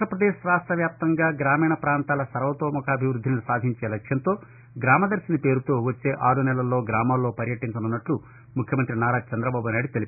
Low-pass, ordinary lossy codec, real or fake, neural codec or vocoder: 3.6 kHz; none; real; none